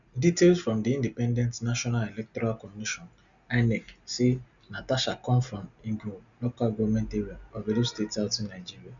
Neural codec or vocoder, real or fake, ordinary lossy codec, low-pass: none; real; none; 7.2 kHz